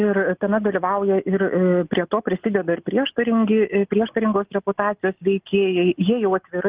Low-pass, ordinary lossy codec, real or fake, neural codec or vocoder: 3.6 kHz; Opus, 32 kbps; real; none